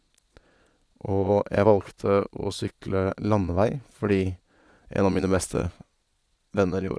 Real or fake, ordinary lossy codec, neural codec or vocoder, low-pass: fake; none; vocoder, 22.05 kHz, 80 mel bands, Vocos; none